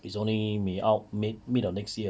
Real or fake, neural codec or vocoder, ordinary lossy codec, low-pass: real; none; none; none